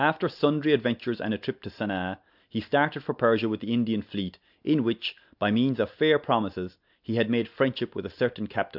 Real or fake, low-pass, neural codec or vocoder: real; 5.4 kHz; none